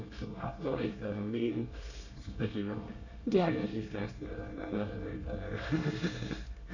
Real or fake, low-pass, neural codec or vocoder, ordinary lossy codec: fake; 7.2 kHz; codec, 24 kHz, 1 kbps, SNAC; none